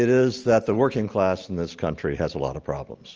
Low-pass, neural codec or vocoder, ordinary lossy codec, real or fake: 7.2 kHz; none; Opus, 24 kbps; real